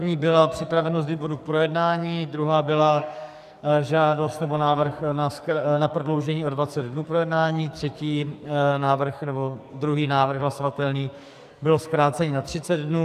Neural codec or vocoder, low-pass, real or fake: codec, 44.1 kHz, 2.6 kbps, SNAC; 14.4 kHz; fake